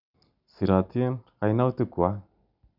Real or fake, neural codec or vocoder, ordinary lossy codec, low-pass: real; none; none; 5.4 kHz